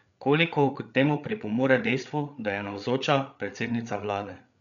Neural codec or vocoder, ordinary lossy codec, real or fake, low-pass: codec, 16 kHz, 8 kbps, FreqCodec, larger model; none; fake; 7.2 kHz